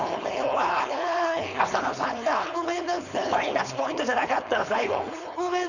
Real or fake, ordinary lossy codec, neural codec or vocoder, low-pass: fake; none; codec, 16 kHz, 4.8 kbps, FACodec; 7.2 kHz